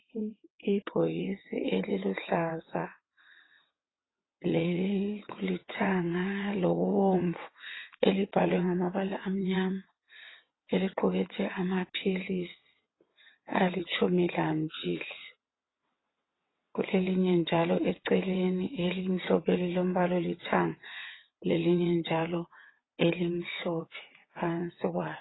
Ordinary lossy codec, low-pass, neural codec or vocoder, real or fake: AAC, 16 kbps; 7.2 kHz; vocoder, 22.05 kHz, 80 mel bands, WaveNeXt; fake